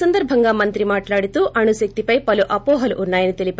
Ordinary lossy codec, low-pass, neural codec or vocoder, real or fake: none; none; none; real